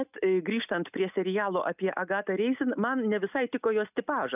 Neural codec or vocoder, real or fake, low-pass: none; real; 3.6 kHz